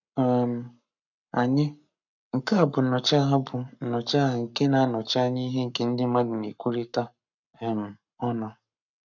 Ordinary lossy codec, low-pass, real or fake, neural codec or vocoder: none; 7.2 kHz; fake; codec, 44.1 kHz, 7.8 kbps, Pupu-Codec